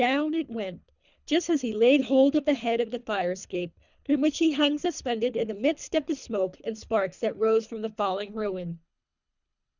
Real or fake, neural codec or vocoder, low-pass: fake; codec, 24 kHz, 3 kbps, HILCodec; 7.2 kHz